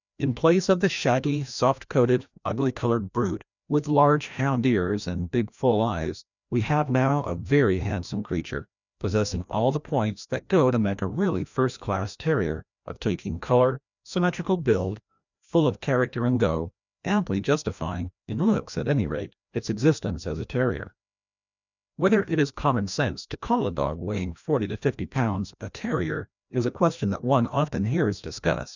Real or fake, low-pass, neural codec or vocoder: fake; 7.2 kHz; codec, 16 kHz, 1 kbps, FreqCodec, larger model